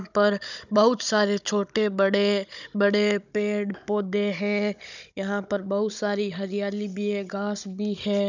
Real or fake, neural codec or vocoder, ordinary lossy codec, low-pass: fake; codec, 16 kHz, 16 kbps, FunCodec, trained on Chinese and English, 50 frames a second; none; 7.2 kHz